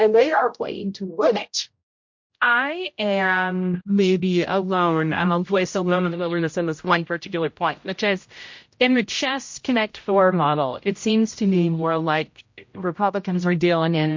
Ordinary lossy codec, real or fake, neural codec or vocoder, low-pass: MP3, 48 kbps; fake; codec, 16 kHz, 0.5 kbps, X-Codec, HuBERT features, trained on general audio; 7.2 kHz